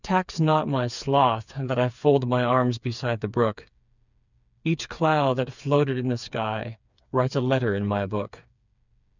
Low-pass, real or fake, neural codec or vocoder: 7.2 kHz; fake; codec, 16 kHz, 4 kbps, FreqCodec, smaller model